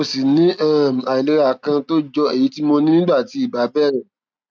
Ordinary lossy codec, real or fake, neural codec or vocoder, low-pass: none; real; none; none